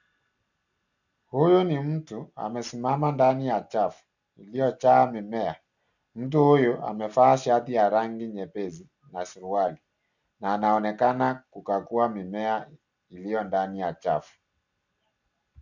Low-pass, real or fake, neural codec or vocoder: 7.2 kHz; real; none